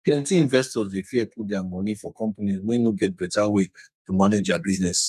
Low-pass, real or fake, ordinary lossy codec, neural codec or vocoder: 14.4 kHz; fake; none; codec, 32 kHz, 1.9 kbps, SNAC